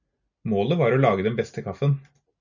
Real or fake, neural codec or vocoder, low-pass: real; none; 7.2 kHz